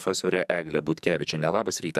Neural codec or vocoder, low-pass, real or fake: codec, 44.1 kHz, 2.6 kbps, SNAC; 14.4 kHz; fake